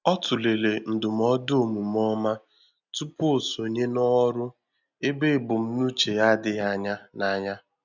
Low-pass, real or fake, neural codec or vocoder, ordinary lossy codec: 7.2 kHz; real; none; none